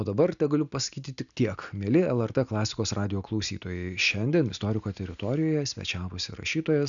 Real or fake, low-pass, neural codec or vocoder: real; 7.2 kHz; none